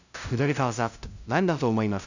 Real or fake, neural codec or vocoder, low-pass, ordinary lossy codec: fake; codec, 16 kHz, 0.5 kbps, FunCodec, trained on LibriTTS, 25 frames a second; 7.2 kHz; none